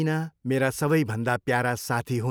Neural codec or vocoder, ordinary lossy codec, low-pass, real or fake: none; none; none; real